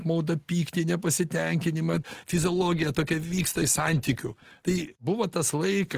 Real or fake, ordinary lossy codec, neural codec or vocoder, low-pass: real; Opus, 16 kbps; none; 14.4 kHz